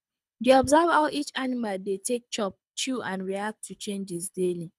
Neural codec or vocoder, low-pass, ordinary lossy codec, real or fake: codec, 24 kHz, 6 kbps, HILCodec; none; none; fake